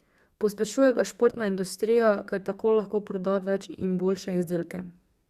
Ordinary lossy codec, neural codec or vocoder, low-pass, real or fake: Opus, 64 kbps; codec, 32 kHz, 1.9 kbps, SNAC; 14.4 kHz; fake